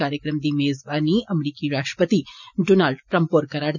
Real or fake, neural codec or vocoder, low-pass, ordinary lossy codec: real; none; 7.2 kHz; none